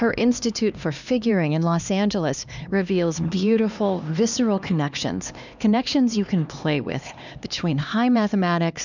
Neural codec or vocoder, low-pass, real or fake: codec, 16 kHz, 2 kbps, X-Codec, HuBERT features, trained on LibriSpeech; 7.2 kHz; fake